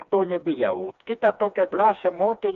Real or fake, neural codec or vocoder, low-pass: fake; codec, 16 kHz, 2 kbps, FreqCodec, smaller model; 7.2 kHz